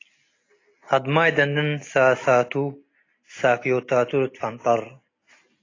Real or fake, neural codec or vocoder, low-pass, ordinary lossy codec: real; none; 7.2 kHz; AAC, 32 kbps